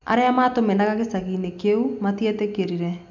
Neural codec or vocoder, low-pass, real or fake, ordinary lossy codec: none; 7.2 kHz; real; none